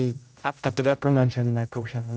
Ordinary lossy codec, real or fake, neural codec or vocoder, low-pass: none; fake; codec, 16 kHz, 0.5 kbps, X-Codec, HuBERT features, trained on general audio; none